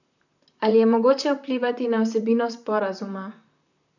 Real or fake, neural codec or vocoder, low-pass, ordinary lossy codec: fake; vocoder, 44.1 kHz, 128 mel bands, Pupu-Vocoder; 7.2 kHz; none